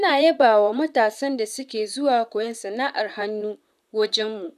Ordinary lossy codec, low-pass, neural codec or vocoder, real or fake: none; 14.4 kHz; vocoder, 44.1 kHz, 128 mel bands, Pupu-Vocoder; fake